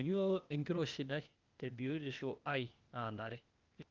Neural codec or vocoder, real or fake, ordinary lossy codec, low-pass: codec, 16 kHz, 0.8 kbps, ZipCodec; fake; Opus, 24 kbps; 7.2 kHz